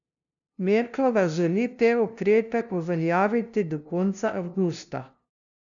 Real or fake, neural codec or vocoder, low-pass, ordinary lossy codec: fake; codec, 16 kHz, 0.5 kbps, FunCodec, trained on LibriTTS, 25 frames a second; 7.2 kHz; none